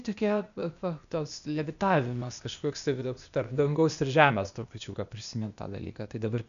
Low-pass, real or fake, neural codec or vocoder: 7.2 kHz; fake; codec, 16 kHz, 0.8 kbps, ZipCodec